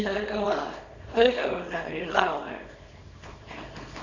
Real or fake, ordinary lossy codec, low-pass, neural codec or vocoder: fake; none; 7.2 kHz; codec, 24 kHz, 0.9 kbps, WavTokenizer, small release